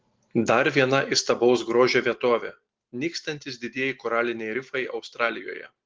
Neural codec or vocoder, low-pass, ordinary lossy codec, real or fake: none; 7.2 kHz; Opus, 16 kbps; real